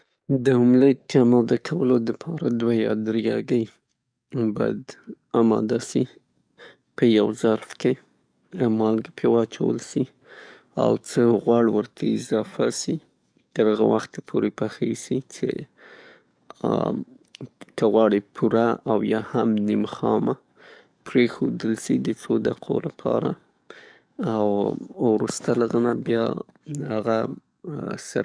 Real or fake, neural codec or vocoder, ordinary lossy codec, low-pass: fake; codec, 44.1 kHz, 7.8 kbps, DAC; none; 9.9 kHz